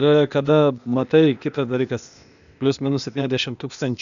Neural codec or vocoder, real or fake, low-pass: codec, 16 kHz, 0.8 kbps, ZipCodec; fake; 7.2 kHz